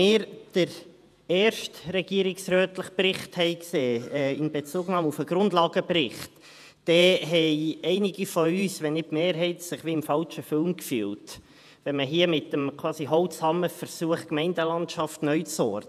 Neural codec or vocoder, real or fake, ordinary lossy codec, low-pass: vocoder, 48 kHz, 128 mel bands, Vocos; fake; none; 14.4 kHz